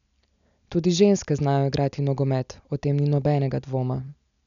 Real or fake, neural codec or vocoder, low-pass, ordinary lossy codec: real; none; 7.2 kHz; none